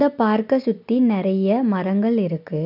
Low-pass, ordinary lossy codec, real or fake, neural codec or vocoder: 5.4 kHz; none; real; none